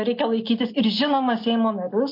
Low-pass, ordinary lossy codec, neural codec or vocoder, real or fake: 5.4 kHz; MP3, 32 kbps; none; real